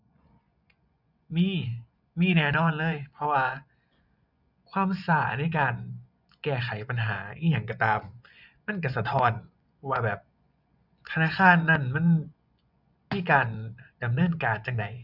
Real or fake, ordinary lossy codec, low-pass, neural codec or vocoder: fake; none; 5.4 kHz; vocoder, 24 kHz, 100 mel bands, Vocos